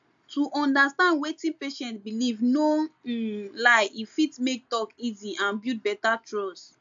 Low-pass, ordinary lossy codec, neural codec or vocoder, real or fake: 7.2 kHz; AAC, 64 kbps; none; real